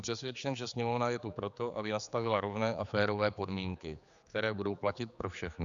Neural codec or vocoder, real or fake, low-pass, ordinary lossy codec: codec, 16 kHz, 4 kbps, X-Codec, HuBERT features, trained on general audio; fake; 7.2 kHz; Opus, 64 kbps